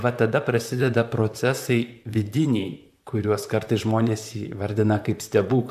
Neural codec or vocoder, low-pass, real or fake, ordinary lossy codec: vocoder, 44.1 kHz, 128 mel bands, Pupu-Vocoder; 14.4 kHz; fake; AAC, 96 kbps